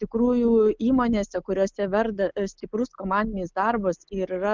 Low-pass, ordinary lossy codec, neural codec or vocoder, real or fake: 7.2 kHz; Opus, 24 kbps; none; real